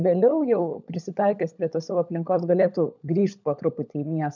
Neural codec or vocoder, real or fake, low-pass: codec, 16 kHz, 16 kbps, FunCodec, trained on LibriTTS, 50 frames a second; fake; 7.2 kHz